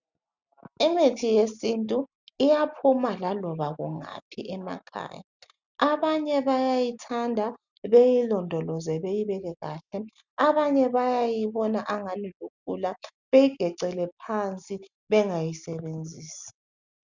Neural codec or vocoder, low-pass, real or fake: none; 7.2 kHz; real